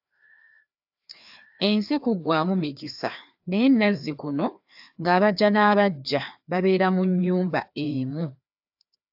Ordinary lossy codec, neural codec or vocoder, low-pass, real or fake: AAC, 48 kbps; codec, 16 kHz, 2 kbps, FreqCodec, larger model; 5.4 kHz; fake